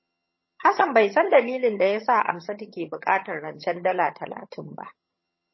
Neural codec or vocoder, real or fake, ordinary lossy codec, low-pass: vocoder, 22.05 kHz, 80 mel bands, HiFi-GAN; fake; MP3, 24 kbps; 7.2 kHz